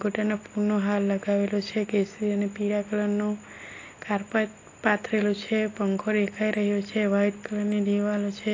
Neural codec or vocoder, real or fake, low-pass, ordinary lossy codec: none; real; 7.2 kHz; AAC, 32 kbps